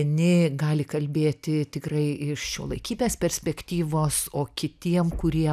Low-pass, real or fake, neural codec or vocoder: 14.4 kHz; real; none